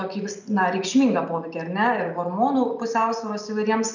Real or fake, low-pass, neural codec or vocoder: real; 7.2 kHz; none